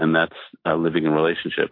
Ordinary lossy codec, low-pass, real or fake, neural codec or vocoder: MP3, 32 kbps; 5.4 kHz; real; none